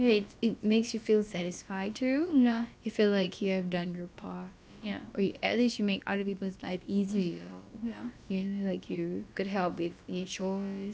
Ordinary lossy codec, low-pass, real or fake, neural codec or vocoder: none; none; fake; codec, 16 kHz, about 1 kbps, DyCAST, with the encoder's durations